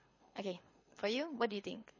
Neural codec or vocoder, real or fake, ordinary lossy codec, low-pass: codec, 24 kHz, 6 kbps, HILCodec; fake; MP3, 32 kbps; 7.2 kHz